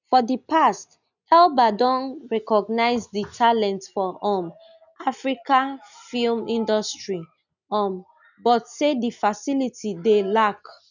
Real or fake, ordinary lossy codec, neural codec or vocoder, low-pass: real; none; none; 7.2 kHz